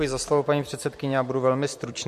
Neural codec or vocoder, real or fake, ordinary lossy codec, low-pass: vocoder, 44.1 kHz, 128 mel bands every 256 samples, BigVGAN v2; fake; MP3, 64 kbps; 14.4 kHz